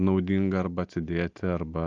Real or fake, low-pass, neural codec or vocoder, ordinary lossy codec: real; 7.2 kHz; none; Opus, 32 kbps